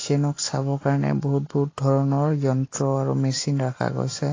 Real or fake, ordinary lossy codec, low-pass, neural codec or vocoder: real; AAC, 32 kbps; 7.2 kHz; none